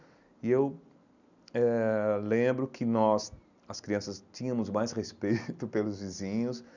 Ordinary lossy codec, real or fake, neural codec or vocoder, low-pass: none; real; none; 7.2 kHz